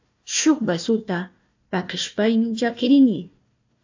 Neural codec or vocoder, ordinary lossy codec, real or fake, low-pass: codec, 16 kHz, 1 kbps, FunCodec, trained on Chinese and English, 50 frames a second; AAC, 48 kbps; fake; 7.2 kHz